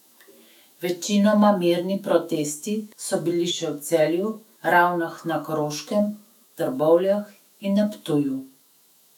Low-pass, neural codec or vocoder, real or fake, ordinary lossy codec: 19.8 kHz; autoencoder, 48 kHz, 128 numbers a frame, DAC-VAE, trained on Japanese speech; fake; none